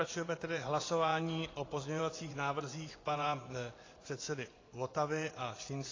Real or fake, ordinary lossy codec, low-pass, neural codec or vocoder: fake; AAC, 32 kbps; 7.2 kHz; vocoder, 24 kHz, 100 mel bands, Vocos